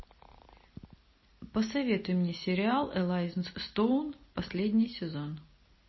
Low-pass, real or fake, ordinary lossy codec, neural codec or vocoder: 7.2 kHz; real; MP3, 24 kbps; none